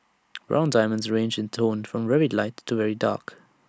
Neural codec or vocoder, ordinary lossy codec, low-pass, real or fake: none; none; none; real